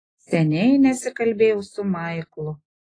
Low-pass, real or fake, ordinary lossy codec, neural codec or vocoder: 9.9 kHz; real; AAC, 32 kbps; none